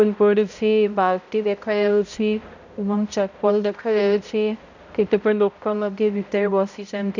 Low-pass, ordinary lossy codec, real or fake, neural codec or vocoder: 7.2 kHz; none; fake; codec, 16 kHz, 0.5 kbps, X-Codec, HuBERT features, trained on balanced general audio